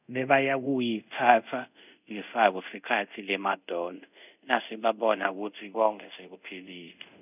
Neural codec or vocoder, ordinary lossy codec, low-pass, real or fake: codec, 24 kHz, 0.5 kbps, DualCodec; none; 3.6 kHz; fake